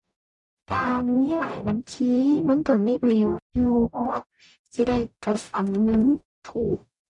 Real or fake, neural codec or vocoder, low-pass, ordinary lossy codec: fake; codec, 44.1 kHz, 0.9 kbps, DAC; 10.8 kHz; none